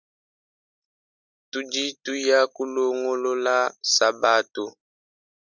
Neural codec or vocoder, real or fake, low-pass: none; real; 7.2 kHz